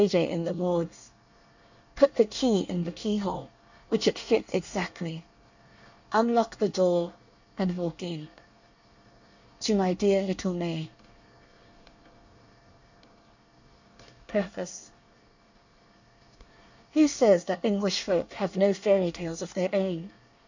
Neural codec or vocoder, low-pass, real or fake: codec, 24 kHz, 1 kbps, SNAC; 7.2 kHz; fake